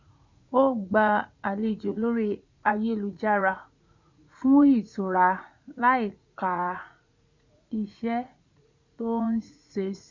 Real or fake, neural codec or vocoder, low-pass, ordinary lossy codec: fake; vocoder, 44.1 kHz, 80 mel bands, Vocos; 7.2 kHz; MP3, 48 kbps